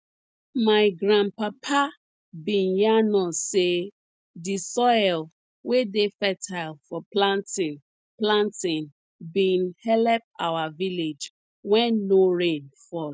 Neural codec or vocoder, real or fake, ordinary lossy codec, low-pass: none; real; none; none